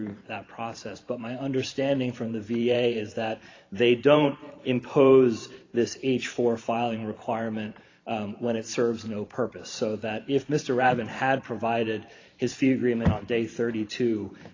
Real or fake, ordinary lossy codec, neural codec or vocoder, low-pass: fake; AAC, 32 kbps; vocoder, 44.1 kHz, 128 mel bands every 512 samples, BigVGAN v2; 7.2 kHz